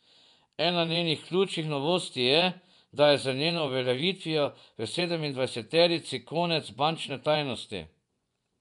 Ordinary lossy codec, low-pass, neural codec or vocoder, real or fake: none; 10.8 kHz; vocoder, 24 kHz, 100 mel bands, Vocos; fake